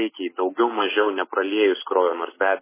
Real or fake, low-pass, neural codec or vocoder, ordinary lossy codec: real; 3.6 kHz; none; MP3, 16 kbps